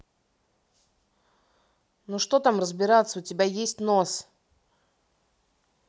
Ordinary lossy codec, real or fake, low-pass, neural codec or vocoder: none; real; none; none